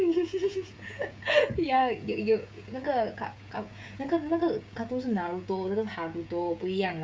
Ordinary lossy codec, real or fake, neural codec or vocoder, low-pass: none; fake; codec, 16 kHz, 16 kbps, FreqCodec, smaller model; none